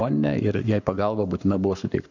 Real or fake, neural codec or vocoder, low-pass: fake; codec, 44.1 kHz, 7.8 kbps, Pupu-Codec; 7.2 kHz